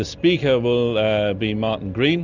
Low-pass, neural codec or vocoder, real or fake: 7.2 kHz; none; real